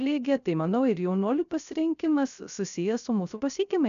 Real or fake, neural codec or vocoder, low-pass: fake; codec, 16 kHz, 0.3 kbps, FocalCodec; 7.2 kHz